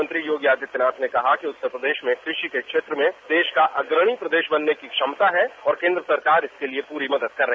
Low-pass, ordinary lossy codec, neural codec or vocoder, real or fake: none; none; none; real